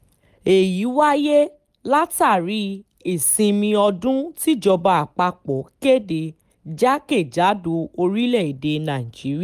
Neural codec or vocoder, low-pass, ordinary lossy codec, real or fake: none; 14.4 kHz; Opus, 32 kbps; real